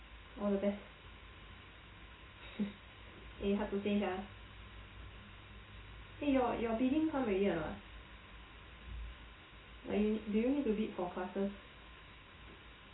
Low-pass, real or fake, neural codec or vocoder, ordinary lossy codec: 7.2 kHz; real; none; AAC, 16 kbps